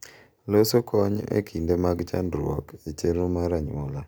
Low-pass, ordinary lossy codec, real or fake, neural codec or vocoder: none; none; real; none